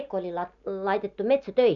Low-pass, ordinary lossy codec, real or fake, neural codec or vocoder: 7.2 kHz; none; real; none